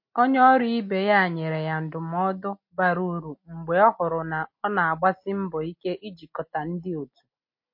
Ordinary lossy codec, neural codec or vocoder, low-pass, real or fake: MP3, 32 kbps; none; 5.4 kHz; real